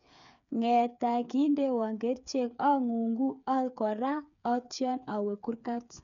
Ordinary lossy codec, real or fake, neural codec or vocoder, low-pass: none; fake; codec, 16 kHz, 8 kbps, FreqCodec, smaller model; 7.2 kHz